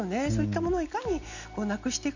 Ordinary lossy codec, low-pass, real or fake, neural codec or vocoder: none; 7.2 kHz; real; none